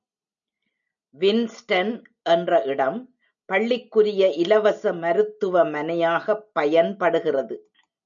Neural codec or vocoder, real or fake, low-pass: none; real; 7.2 kHz